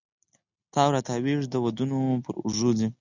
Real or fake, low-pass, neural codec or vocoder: real; 7.2 kHz; none